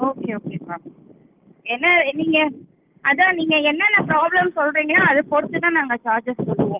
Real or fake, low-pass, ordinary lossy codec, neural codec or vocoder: real; 3.6 kHz; Opus, 32 kbps; none